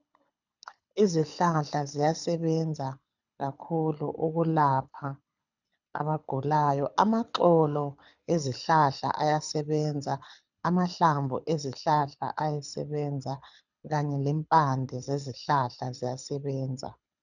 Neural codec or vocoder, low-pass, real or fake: codec, 24 kHz, 6 kbps, HILCodec; 7.2 kHz; fake